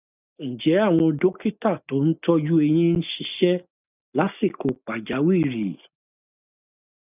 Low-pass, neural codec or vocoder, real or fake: 3.6 kHz; none; real